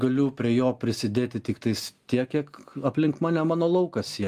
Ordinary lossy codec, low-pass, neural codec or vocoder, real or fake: Opus, 32 kbps; 14.4 kHz; none; real